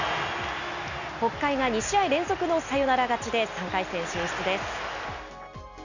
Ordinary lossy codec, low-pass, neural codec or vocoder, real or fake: none; 7.2 kHz; none; real